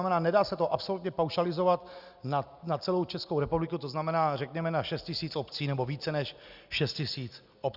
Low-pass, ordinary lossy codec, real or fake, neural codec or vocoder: 5.4 kHz; Opus, 64 kbps; real; none